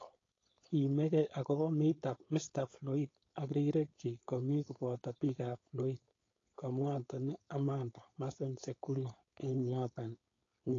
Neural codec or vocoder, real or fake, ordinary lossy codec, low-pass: codec, 16 kHz, 4.8 kbps, FACodec; fake; AAC, 32 kbps; 7.2 kHz